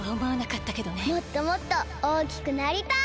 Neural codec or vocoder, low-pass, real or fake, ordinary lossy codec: none; none; real; none